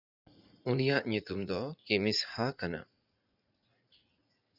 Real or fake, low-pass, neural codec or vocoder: fake; 5.4 kHz; vocoder, 44.1 kHz, 80 mel bands, Vocos